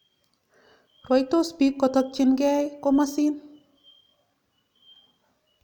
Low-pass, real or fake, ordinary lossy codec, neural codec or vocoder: 19.8 kHz; real; none; none